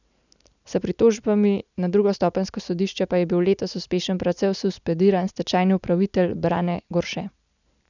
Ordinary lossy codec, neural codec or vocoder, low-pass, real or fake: none; none; 7.2 kHz; real